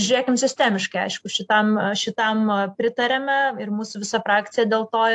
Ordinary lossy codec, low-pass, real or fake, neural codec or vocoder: AAC, 64 kbps; 10.8 kHz; real; none